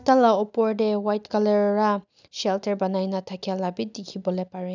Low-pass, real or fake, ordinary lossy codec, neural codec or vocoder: 7.2 kHz; real; none; none